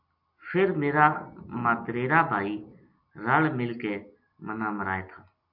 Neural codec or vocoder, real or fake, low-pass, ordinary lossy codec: none; real; 5.4 kHz; MP3, 48 kbps